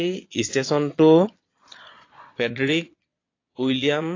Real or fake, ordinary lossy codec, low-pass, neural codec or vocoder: fake; AAC, 32 kbps; 7.2 kHz; vocoder, 22.05 kHz, 80 mel bands, Vocos